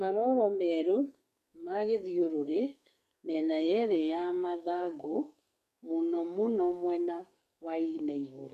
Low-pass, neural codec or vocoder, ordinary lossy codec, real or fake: 14.4 kHz; codec, 44.1 kHz, 2.6 kbps, SNAC; none; fake